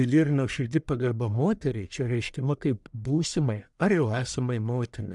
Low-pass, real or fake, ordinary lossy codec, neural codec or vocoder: 10.8 kHz; fake; MP3, 96 kbps; codec, 44.1 kHz, 1.7 kbps, Pupu-Codec